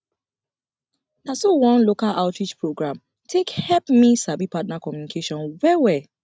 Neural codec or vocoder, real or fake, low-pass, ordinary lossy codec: none; real; none; none